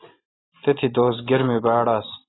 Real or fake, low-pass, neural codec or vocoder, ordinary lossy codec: real; 7.2 kHz; none; AAC, 16 kbps